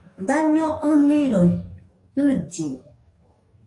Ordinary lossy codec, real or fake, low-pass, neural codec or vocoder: AAC, 64 kbps; fake; 10.8 kHz; codec, 44.1 kHz, 2.6 kbps, DAC